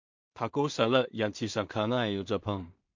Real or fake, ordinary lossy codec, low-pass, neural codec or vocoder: fake; MP3, 48 kbps; 7.2 kHz; codec, 16 kHz in and 24 kHz out, 0.4 kbps, LongCat-Audio-Codec, two codebook decoder